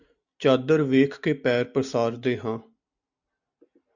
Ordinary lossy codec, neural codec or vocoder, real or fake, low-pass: Opus, 64 kbps; none; real; 7.2 kHz